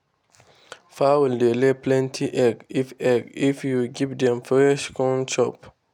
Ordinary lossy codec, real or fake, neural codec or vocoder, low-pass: none; real; none; 19.8 kHz